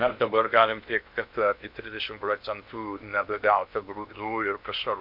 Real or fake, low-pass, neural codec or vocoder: fake; 5.4 kHz; codec, 16 kHz in and 24 kHz out, 0.8 kbps, FocalCodec, streaming, 65536 codes